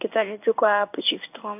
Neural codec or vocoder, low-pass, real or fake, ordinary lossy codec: codec, 16 kHz in and 24 kHz out, 1 kbps, XY-Tokenizer; 3.6 kHz; fake; none